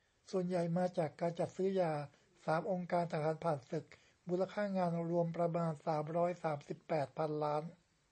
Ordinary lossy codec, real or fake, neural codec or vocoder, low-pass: MP3, 32 kbps; real; none; 10.8 kHz